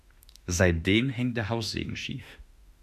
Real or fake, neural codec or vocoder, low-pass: fake; autoencoder, 48 kHz, 32 numbers a frame, DAC-VAE, trained on Japanese speech; 14.4 kHz